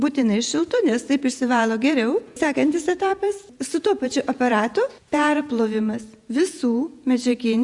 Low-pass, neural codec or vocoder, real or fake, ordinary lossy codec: 10.8 kHz; vocoder, 48 kHz, 128 mel bands, Vocos; fake; Opus, 64 kbps